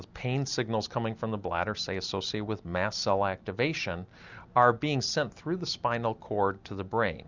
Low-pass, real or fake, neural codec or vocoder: 7.2 kHz; real; none